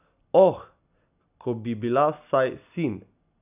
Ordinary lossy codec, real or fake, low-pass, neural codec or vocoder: none; real; 3.6 kHz; none